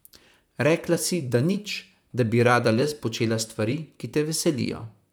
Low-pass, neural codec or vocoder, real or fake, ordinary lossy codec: none; vocoder, 44.1 kHz, 128 mel bands, Pupu-Vocoder; fake; none